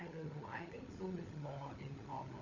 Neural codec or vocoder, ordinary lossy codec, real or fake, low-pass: codec, 16 kHz, 16 kbps, FunCodec, trained on LibriTTS, 50 frames a second; none; fake; 7.2 kHz